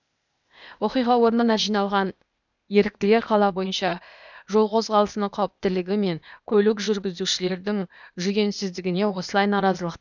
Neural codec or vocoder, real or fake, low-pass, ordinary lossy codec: codec, 16 kHz, 0.8 kbps, ZipCodec; fake; 7.2 kHz; none